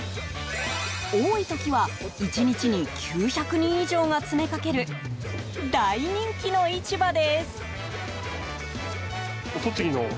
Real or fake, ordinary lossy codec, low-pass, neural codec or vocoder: real; none; none; none